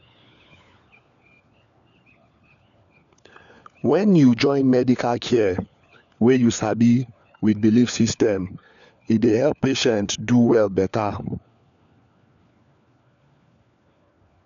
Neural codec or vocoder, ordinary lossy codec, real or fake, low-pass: codec, 16 kHz, 4 kbps, FunCodec, trained on LibriTTS, 50 frames a second; none; fake; 7.2 kHz